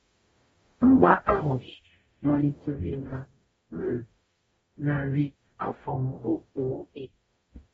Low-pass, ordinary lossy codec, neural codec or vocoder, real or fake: 19.8 kHz; AAC, 24 kbps; codec, 44.1 kHz, 0.9 kbps, DAC; fake